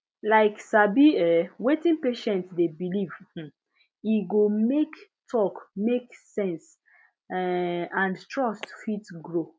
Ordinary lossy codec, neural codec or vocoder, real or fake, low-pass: none; none; real; none